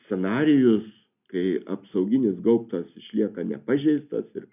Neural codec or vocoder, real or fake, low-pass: none; real; 3.6 kHz